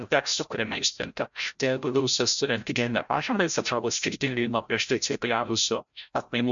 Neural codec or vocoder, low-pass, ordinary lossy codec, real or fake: codec, 16 kHz, 0.5 kbps, FreqCodec, larger model; 7.2 kHz; MP3, 64 kbps; fake